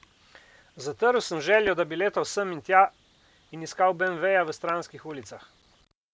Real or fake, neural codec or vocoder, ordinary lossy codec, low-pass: real; none; none; none